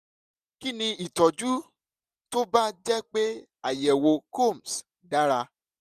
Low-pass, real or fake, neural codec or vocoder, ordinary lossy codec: 14.4 kHz; real; none; none